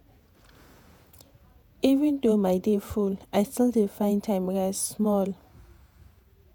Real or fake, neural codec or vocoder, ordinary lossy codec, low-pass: fake; vocoder, 48 kHz, 128 mel bands, Vocos; none; none